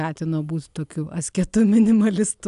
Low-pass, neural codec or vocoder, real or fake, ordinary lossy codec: 10.8 kHz; none; real; AAC, 96 kbps